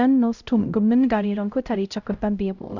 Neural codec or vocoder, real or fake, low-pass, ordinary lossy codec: codec, 16 kHz, 0.5 kbps, X-Codec, HuBERT features, trained on LibriSpeech; fake; 7.2 kHz; none